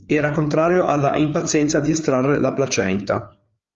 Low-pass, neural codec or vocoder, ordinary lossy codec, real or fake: 7.2 kHz; codec, 16 kHz, 4 kbps, FreqCodec, larger model; Opus, 32 kbps; fake